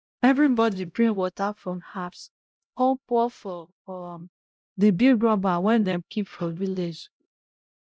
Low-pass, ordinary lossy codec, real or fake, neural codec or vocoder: none; none; fake; codec, 16 kHz, 0.5 kbps, X-Codec, HuBERT features, trained on LibriSpeech